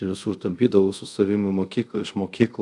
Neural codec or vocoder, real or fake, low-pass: codec, 24 kHz, 0.5 kbps, DualCodec; fake; 10.8 kHz